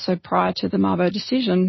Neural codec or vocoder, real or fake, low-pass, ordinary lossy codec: none; real; 7.2 kHz; MP3, 24 kbps